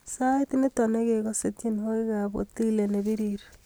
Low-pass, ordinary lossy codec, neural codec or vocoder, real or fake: none; none; none; real